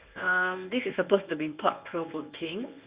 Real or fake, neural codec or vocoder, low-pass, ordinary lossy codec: fake; codec, 24 kHz, 0.9 kbps, WavTokenizer, medium speech release version 1; 3.6 kHz; Opus, 64 kbps